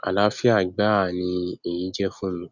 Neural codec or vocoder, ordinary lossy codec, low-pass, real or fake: none; none; 7.2 kHz; real